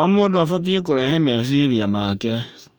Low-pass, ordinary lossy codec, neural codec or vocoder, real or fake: 19.8 kHz; none; codec, 44.1 kHz, 2.6 kbps, DAC; fake